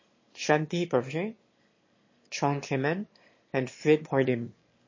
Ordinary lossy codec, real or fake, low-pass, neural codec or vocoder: MP3, 32 kbps; fake; 7.2 kHz; autoencoder, 22.05 kHz, a latent of 192 numbers a frame, VITS, trained on one speaker